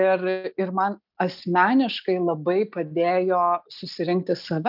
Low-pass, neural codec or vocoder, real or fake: 5.4 kHz; none; real